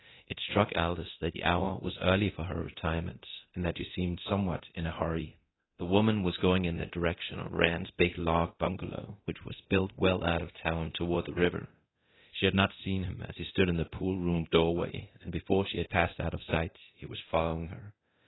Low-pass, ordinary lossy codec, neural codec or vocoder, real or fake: 7.2 kHz; AAC, 16 kbps; codec, 24 kHz, 0.9 kbps, DualCodec; fake